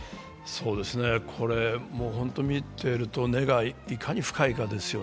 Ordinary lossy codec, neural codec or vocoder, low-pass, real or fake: none; none; none; real